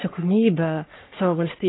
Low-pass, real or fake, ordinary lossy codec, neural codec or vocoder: 7.2 kHz; fake; AAC, 16 kbps; codec, 44.1 kHz, 3.4 kbps, Pupu-Codec